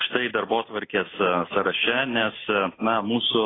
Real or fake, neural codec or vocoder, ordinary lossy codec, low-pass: real; none; AAC, 16 kbps; 7.2 kHz